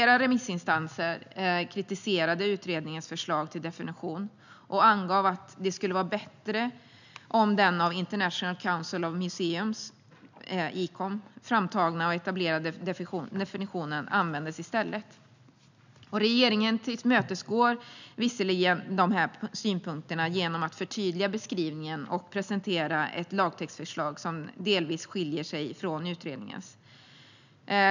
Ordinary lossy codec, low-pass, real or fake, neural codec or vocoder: none; 7.2 kHz; real; none